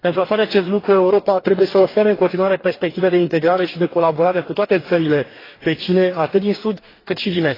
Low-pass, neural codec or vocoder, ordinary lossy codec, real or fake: 5.4 kHz; codec, 44.1 kHz, 2.6 kbps, DAC; AAC, 24 kbps; fake